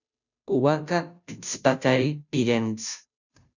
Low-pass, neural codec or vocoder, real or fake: 7.2 kHz; codec, 16 kHz, 0.5 kbps, FunCodec, trained on Chinese and English, 25 frames a second; fake